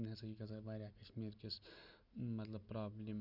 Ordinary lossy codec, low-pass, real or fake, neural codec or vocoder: Opus, 64 kbps; 5.4 kHz; real; none